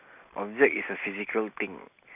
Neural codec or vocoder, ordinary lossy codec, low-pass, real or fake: none; none; 3.6 kHz; real